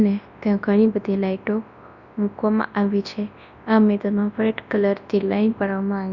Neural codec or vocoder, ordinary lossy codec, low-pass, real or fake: codec, 24 kHz, 0.9 kbps, WavTokenizer, large speech release; Opus, 64 kbps; 7.2 kHz; fake